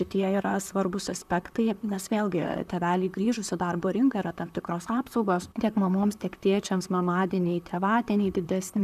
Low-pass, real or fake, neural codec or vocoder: 14.4 kHz; fake; vocoder, 44.1 kHz, 128 mel bands, Pupu-Vocoder